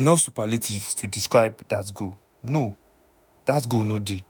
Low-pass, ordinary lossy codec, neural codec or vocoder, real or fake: none; none; autoencoder, 48 kHz, 32 numbers a frame, DAC-VAE, trained on Japanese speech; fake